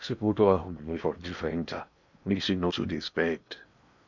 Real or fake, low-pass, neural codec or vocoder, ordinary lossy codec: fake; 7.2 kHz; codec, 16 kHz in and 24 kHz out, 0.8 kbps, FocalCodec, streaming, 65536 codes; none